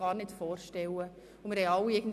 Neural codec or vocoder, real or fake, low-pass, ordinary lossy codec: none; real; 14.4 kHz; none